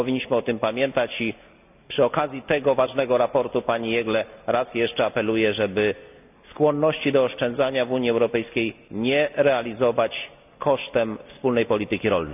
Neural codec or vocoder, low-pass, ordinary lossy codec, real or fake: none; 3.6 kHz; none; real